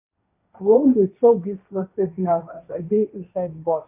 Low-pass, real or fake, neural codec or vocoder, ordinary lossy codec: 3.6 kHz; fake; codec, 16 kHz, 1.1 kbps, Voila-Tokenizer; AAC, 32 kbps